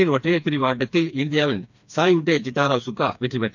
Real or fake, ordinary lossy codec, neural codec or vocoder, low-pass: fake; none; codec, 16 kHz, 2 kbps, FreqCodec, smaller model; 7.2 kHz